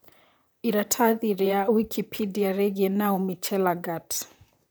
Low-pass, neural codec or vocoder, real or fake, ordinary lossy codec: none; vocoder, 44.1 kHz, 128 mel bands, Pupu-Vocoder; fake; none